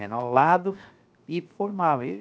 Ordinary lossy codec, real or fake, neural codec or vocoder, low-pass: none; fake; codec, 16 kHz, 0.7 kbps, FocalCodec; none